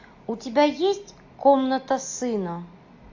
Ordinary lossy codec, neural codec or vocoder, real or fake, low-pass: AAC, 48 kbps; none; real; 7.2 kHz